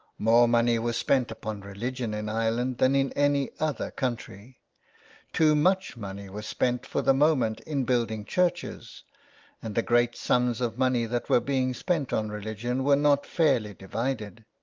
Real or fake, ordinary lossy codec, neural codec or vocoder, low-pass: real; Opus, 24 kbps; none; 7.2 kHz